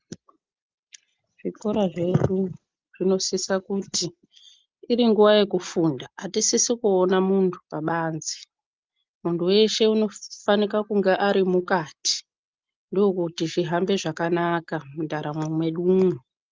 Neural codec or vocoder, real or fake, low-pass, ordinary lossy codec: none; real; 7.2 kHz; Opus, 32 kbps